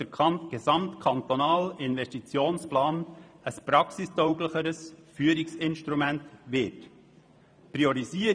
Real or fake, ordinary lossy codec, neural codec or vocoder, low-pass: fake; none; vocoder, 44.1 kHz, 128 mel bands every 512 samples, BigVGAN v2; 9.9 kHz